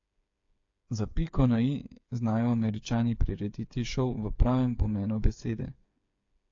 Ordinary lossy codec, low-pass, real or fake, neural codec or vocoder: AAC, 48 kbps; 7.2 kHz; fake; codec, 16 kHz, 8 kbps, FreqCodec, smaller model